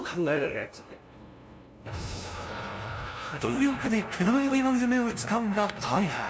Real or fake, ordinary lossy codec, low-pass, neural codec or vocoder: fake; none; none; codec, 16 kHz, 0.5 kbps, FunCodec, trained on LibriTTS, 25 frames a second